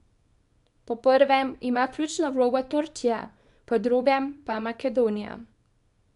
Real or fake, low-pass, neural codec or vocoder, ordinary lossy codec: fake; 10.8 kHz; codec, 24 kHz, 0.9 kbps, WavTokenizer, small release; none